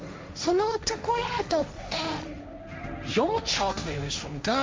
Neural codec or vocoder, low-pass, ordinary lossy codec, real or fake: codec, 16 kHz, 1.1 kbps, Voila-Tokenizer; none; none; fake